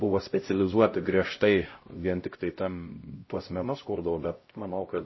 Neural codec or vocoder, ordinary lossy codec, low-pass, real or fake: codec, 16 kHz, 0.5 kbps, X-Codec, HuBERT features, trained on LibriSpeech; MP3, 24 kbps; 7.2 kHz; fake